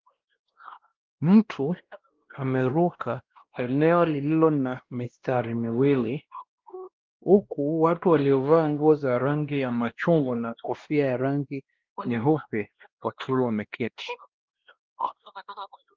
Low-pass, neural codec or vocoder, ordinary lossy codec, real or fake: 7.2 kHz; codec, 16 kHz, 1 kbps, X-Codec, WavLM features, trained on Multilingual LibriSpeech; Opus, 16 kbps; fake